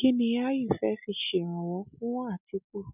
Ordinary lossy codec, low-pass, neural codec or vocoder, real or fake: none; 3.6 kHz; none; real